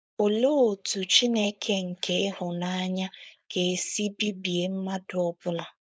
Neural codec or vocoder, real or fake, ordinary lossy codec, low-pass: codec, 16 kHz, 4.8 kbps, FACodec; fake; none; none